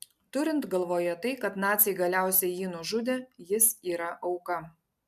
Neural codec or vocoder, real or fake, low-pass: none; real; 14.4 kHz